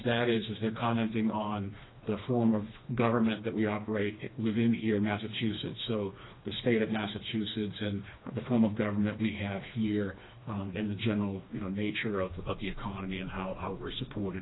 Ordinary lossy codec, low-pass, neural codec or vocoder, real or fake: AAC, 16 kbps; 7.2 kHz; codec, 16 kHz, 2 kbps, FreqCodec, smaller model; fake